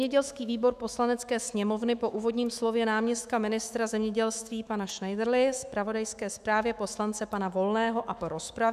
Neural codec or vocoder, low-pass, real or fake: autoencoder, 48 kHz, 128 numbers a frame, DAC-VAE, trained on Japanese speech; 14.4 kHz; fake